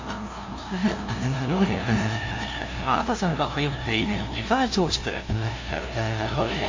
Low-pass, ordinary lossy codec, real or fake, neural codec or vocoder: 7.2 kHz; AAC, 48 kbps; fake; codec, 16 kHz, 0.5 kbps, FunCodec, trained on LibriTTS, 25 frames a second